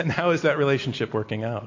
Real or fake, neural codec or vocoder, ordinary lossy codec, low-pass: real; none; MP3, 48 kbps; 7.2 kHz